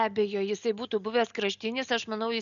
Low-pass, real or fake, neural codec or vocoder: 7.2 kHz; real; none